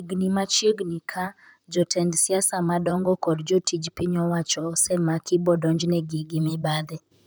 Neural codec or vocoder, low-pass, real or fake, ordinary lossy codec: vocoder, 44.1 kHz, 128 mel bands, Pupu-Vocoder; none; fake; none